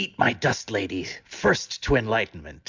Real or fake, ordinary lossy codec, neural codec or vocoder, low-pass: real; MP3, 64 kbps; none; 7.2 kHz